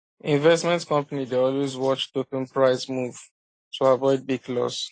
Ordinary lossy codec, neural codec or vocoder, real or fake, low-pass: AAC, 32 kbps; none; real; 9.9 kHz